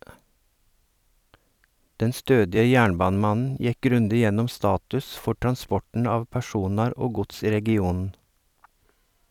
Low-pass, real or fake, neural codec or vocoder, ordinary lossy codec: 19.8 kHz; fake; vocoder, 44.1 kHz, 128 mel bands every 256 samples, BigVGAN v2; none